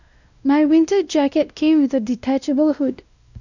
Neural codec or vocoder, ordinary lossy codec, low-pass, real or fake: codec, 16 kHz, 1 kbps, X-Codec, WavLM features, trained on Multilingual LibriSpeech; none; 7.2 kHz; fake